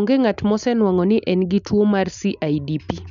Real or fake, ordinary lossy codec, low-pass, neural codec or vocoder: real; MP3, 96 kbps; 7.2 kHz; none